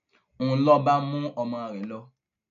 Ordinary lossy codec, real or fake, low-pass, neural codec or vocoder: none; real; 7.2 kHz; none